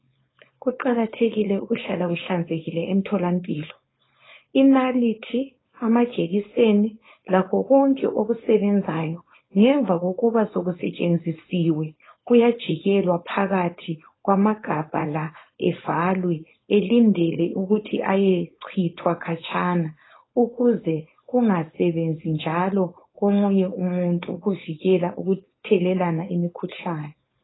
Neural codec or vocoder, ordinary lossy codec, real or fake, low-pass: codec, 16 kHz, 4.8 kbps, FACodec; AAC, 16 kbps; fake; 7.2 kHz